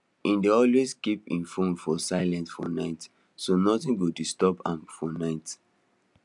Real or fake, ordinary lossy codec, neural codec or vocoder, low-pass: real; none; none; 10.8 kHz